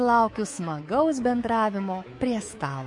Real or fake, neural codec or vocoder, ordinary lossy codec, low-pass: fake; codec, 24 kHz, 3.1 kbps, DualCodec; MP3, 48 kbps; 10.8 kHz